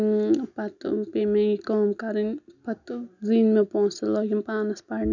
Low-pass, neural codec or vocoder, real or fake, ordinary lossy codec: 7.2 kHz; none; real; none